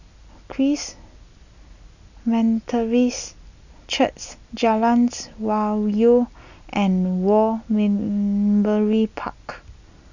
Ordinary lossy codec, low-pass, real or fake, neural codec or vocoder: none; 7.2 kHz; real; none